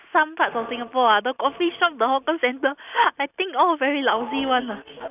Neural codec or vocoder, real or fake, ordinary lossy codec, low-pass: none; real; none; 3.6 kHz